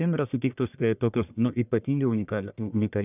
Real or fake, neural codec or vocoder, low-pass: fake; codec, 44.1 kHz, 1.7 kbps, Pupu-Codec; 3.6 kHz